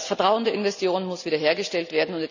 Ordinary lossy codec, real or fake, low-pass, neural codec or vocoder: none; real; 7.2 kHz; none